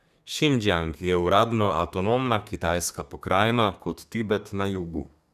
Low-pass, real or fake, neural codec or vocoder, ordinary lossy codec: 14.4 kHz; fake; codec, 32 kHz, 1.9 kbps, SNAC; none